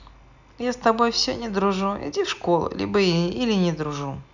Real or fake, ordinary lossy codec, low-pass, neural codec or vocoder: real; none; 7.2 kHz; none